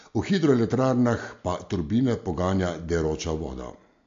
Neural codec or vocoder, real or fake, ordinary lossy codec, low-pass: none; real; AAC, 48 kbps; 7.2 kHz